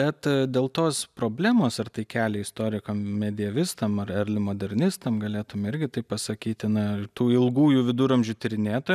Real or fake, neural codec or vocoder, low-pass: real; none; 14.4 kHz